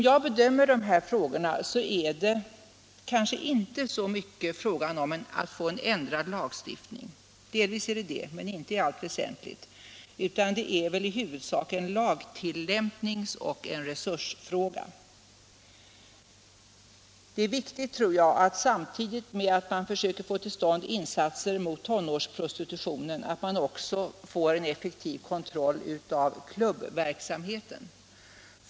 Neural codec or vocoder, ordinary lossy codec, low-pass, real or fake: none; none; none; real